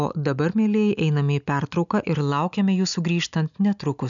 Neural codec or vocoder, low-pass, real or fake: none; 7.2 kHz; real